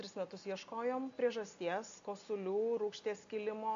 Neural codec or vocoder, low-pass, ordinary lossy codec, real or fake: none; 7.2 kHz; AAC, 64 kbps; real